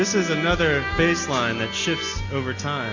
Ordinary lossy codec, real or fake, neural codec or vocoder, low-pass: MP3, 64 kbps; real; none; 7.2 kHz